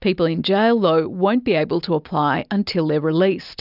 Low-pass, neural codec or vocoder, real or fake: 5.4 kHz; none; real